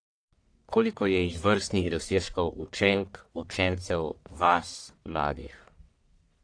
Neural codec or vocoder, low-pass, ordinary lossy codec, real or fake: codec, 44.1 kHz, 1.7 kbps, Pupu-Codec; 9.9 kHz; AAC, 48 kbps; fake